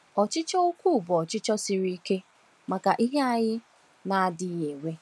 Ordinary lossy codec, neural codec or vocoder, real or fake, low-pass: none; none; real; none